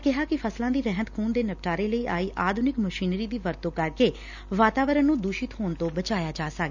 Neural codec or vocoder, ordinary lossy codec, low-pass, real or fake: none; none; 7.2 kHz; real